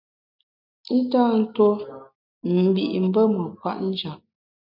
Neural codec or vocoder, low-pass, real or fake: none; 5.4 kHz; real